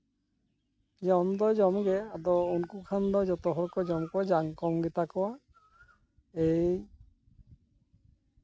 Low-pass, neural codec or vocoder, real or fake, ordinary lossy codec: none; none; real; none